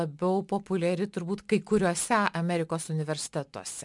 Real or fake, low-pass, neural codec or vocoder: real; 10.8 kHz; none